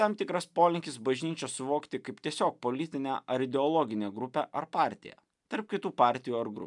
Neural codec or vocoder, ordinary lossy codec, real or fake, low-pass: none; MP3, 96 kbps; real; 10.8 kHz